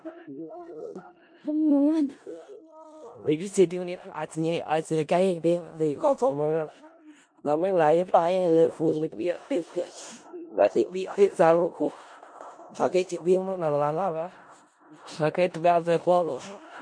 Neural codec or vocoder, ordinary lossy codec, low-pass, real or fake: codec, 16 kHz in and 24 kHz out, 0.4 kbps, LongCat-Audio-Codec, four codebook decoder; MP3, 48 kbps; 9.9 kHz; fake